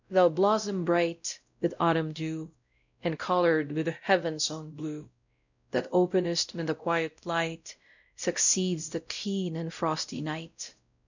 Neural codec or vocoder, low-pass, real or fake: codec, 16 kHz, 0.5 kbps, X-Codec, WavLM features, trained on Multilingual LibriSpeech; 7.2 kHz; fake